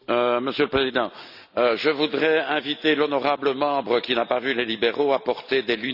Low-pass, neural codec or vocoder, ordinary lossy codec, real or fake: 5.4 kHz; none; none; real